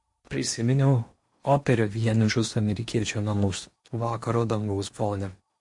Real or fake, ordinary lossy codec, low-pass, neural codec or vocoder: fake; MP3, 48 kbps; 10.8 kHz; codec, 16 kHz in and 24 kHz out, 0.8 kbps, FocalCodec, streaming, 65536 codes